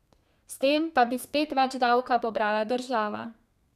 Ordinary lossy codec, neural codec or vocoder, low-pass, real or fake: none; codec, 32 kHz, 1.9 kbps, SNAC; 14.4 kHz; fake